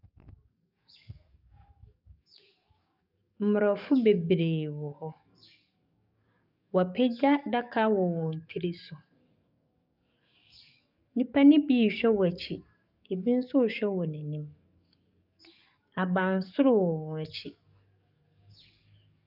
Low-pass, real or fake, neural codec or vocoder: 5.4 kHz; fake; codec, 44.1 kHz, 7.8 kbps, DAC